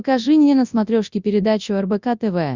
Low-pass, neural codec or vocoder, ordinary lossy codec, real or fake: 7.2 kHz; codec, 24 kHz, 0.9 kbps, WavTokenizer, large speech release; Opus, 64 kbps; fake